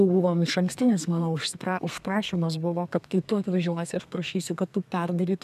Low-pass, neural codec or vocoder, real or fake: 14.4 kHz; codec, 32 kHz, 1.9 kbps, SNAC; fake